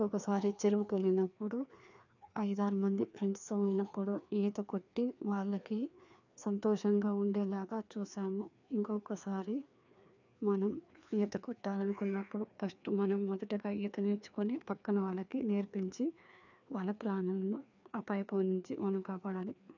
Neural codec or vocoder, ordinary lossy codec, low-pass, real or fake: codec, 16 kHz, 2 kbps, FreqCodec, larger model; none; 7.2 kHz; fake